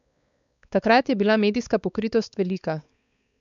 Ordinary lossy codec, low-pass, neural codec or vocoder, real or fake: none; 7.2 kHz; codec, 16 kHz, 4 kbps, X-Codec, WavLM features, trained on Multilingual LibriSpeech; fake